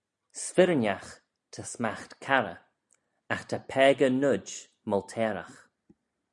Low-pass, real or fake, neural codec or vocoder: 10.8 kHz; real; none